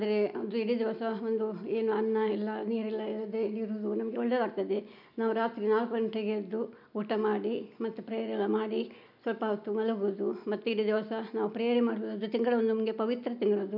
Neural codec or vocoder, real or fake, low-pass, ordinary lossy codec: none; real; 5.4 kHz; none